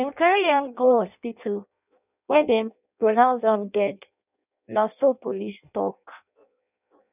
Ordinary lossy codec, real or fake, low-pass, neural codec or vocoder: none; fake; 3.6 kHz; codec, 16 kHz in and 24 kHz out, 0.6 kbps, FireRedTTS-2 codec